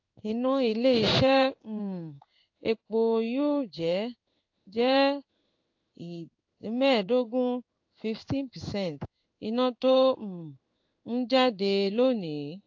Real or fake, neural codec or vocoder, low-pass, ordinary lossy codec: fake; codec, 16 kHz in and 24 kHz out, 1 kbps, XY-Tokenizer; 7.2 kHz; AAC, 48 kbps